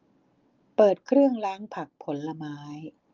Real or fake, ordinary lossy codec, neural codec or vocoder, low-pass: real; Opus, 32 kbps; none; 7.2 kHz